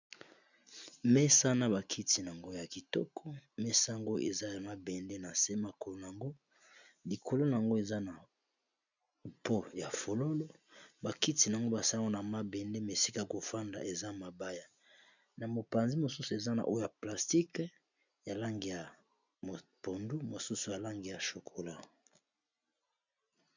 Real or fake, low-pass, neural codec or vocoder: real; 7.2 kHz; none